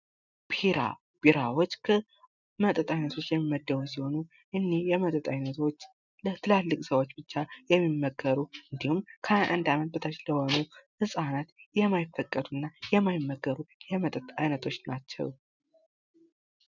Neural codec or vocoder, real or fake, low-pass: none; real; 7.2 kHz